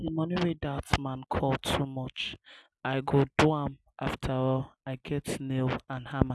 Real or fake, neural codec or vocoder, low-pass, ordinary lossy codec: real; none; none; none